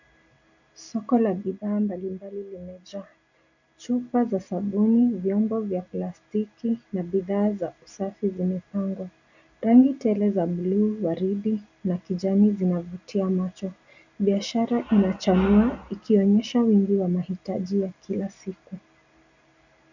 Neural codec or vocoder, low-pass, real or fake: none; 7.2 kHz; real